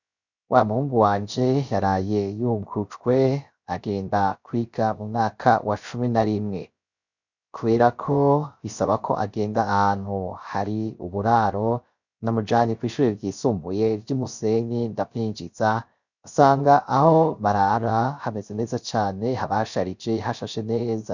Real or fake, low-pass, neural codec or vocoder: fake; 7.2 kHz; codec, 16 kHz, 0.3 kbps, FocalCodec